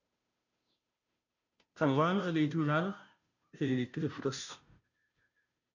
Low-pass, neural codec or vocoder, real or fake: 7.2 kHz; codec, 16 kHz, 0.5 kbps, FunCodec, trained on Chinese and English, 25 frames a second; fake